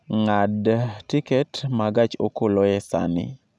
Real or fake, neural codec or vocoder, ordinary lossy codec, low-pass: real; none; none; none